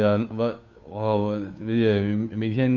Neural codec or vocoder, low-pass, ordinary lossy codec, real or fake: codec, 16 kHz, 2 kbps, FunCodec, trained on Chinese and English, 25 frames a second; 7.2 kHz; none; fake